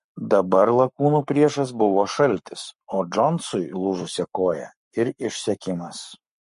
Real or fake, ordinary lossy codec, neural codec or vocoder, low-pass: fake; MP3, 48 kbps; codec, 44.1 kHz, 7.8 kbps, Pupu-Codec; 14.4 kHz